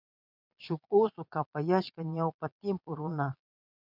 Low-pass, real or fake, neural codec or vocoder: 5.4 kHz; fake; vocoder, 22.05 kHz, 80 mel bands, Vocos